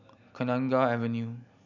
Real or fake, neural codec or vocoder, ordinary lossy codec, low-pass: real; none; none; 7.2 kHz